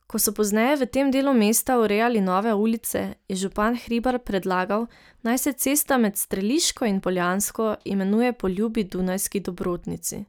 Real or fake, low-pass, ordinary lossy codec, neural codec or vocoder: real; none; none; none